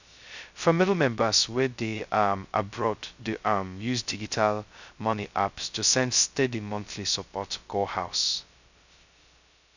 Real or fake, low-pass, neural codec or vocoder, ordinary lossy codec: fake; 7.2 kHz; codec, 16 kHz, 0.2 kbps, FocalCodec; none